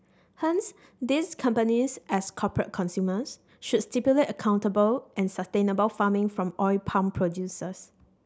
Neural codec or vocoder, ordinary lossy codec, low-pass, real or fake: none; none; none; real